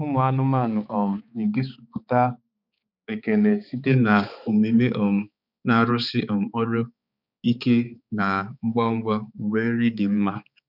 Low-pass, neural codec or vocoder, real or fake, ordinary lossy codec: 5.4 kHz; codec, 16 kHz, 4 kbps, X-Codec, HuBERT features, trained on balanced general audio; fake; none